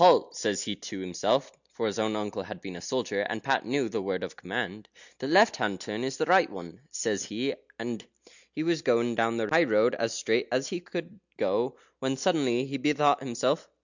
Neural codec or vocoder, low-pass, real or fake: none; 7.2 kHz; real